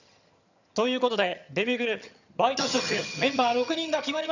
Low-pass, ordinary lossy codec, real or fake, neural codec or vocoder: 7.2 kHz; none; fake; vocoder, 22.05 kHz, 80 mel bands, HiFi-GAN